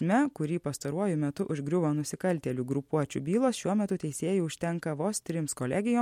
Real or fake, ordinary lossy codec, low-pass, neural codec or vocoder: real; MP3, 64 kbps; 19.8 kHz; none